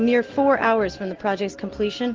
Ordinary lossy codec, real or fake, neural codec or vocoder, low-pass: Opus, 16 kbps; real; none; 7.2 kHz